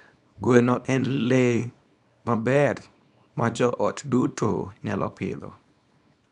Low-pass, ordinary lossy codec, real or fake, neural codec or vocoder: 10.8 kHz; none; fake; codec, 24 kHz, 0.9 kbps, WavTokenizer, small release